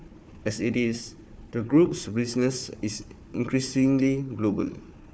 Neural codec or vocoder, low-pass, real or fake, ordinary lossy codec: codec, 16 kHz, 4 kbps, FunCodec, trained on Chinese and English, 50 frames a second; none; fake; none